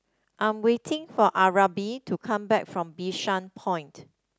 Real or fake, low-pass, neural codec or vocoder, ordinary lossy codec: real; none; none; none